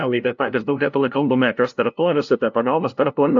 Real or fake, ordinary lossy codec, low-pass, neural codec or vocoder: fake; AAC, 64 kbps; 7.2 kHz; codec, 16 kHz, 0.5 kbps, FunCodec, trained on LibriTTS, 25 frames a second